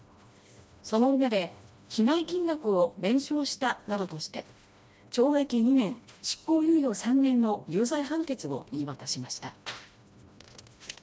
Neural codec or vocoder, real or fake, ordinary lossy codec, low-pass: codec, 16 kHz, 1 kbps, FreqCodec, smaller model; fake; none; none